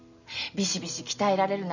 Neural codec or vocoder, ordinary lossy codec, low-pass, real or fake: none; none; 7.2 kHz; real